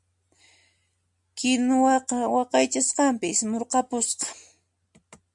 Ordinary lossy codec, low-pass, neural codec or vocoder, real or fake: MP3, 96 kbps; 10.8 kHz; none; real